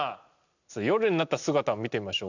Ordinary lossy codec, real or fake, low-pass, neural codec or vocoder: none; real; 7.2 kHz; none